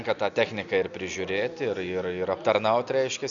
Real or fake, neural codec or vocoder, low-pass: real; none; 7.2 kHz